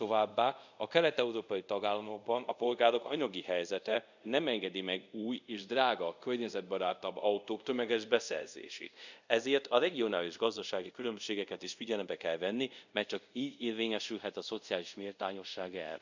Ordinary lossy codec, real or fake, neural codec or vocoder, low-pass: none; fake; codec, 24 kHz, 0.5 kbps, DualCodec; 7.2 kHz